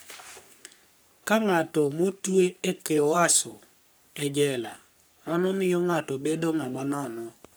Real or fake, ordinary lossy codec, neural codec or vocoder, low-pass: fake; none; codec, 44.1 kHz, 3.4 kbps, Pupu-Codec; none